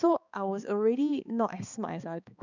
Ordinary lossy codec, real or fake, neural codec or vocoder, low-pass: none; fake; codec, 16 kHz, 2 kbps, X-Codec, HuBERT features, trained on balanced general audio; 7.2 kHz